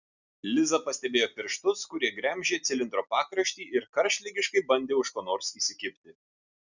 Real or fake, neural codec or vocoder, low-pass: real; none; 7.2 kHz